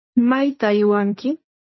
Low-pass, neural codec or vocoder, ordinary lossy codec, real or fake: 7.2 kHz; codec, 16 kHz, 1.1 kbps, Voila-Tokenizer; MP3, 24 kbps; fake